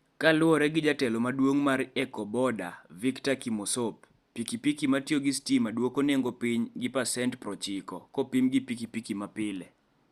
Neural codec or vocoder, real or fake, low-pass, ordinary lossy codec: none; real; 14.4 kHz; Opus, 64 kbps